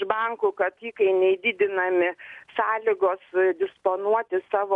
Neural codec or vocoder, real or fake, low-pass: none; real; 10.8 kHz